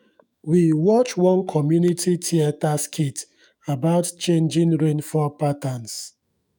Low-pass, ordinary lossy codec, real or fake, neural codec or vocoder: none; none; fake; autoencoder, 48 kHz, 128 numbers a frame, DAC-VAE, trained on Japanese speech